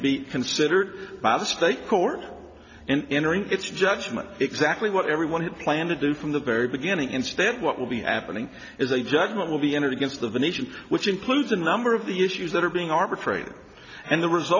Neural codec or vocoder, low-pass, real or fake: none; 7.2 kHz; real